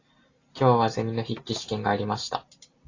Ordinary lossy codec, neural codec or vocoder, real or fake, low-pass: AAC, 32 kbps; none; real; 7.2 kHz